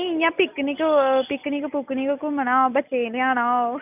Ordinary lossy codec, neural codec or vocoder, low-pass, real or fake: none; none; 3.6 kHz; real